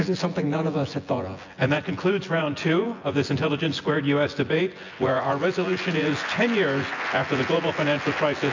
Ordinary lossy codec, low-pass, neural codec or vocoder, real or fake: AAC, 48 kbps; 7.2 kHz; vocoder, 24 kHz, 100 mel bands, Vocos; fake